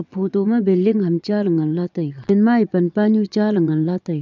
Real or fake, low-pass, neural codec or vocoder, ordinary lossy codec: fake; 7.2 kHz; vocoder, 22.05 kHz, 80 mel bands, Vocos; none